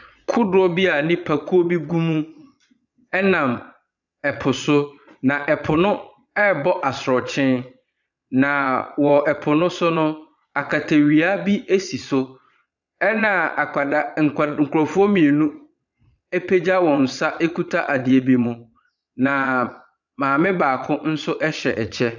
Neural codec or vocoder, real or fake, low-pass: vocoder, 22.05 kHz, 80 mel bands, Vocos; fake; 7.2 kHz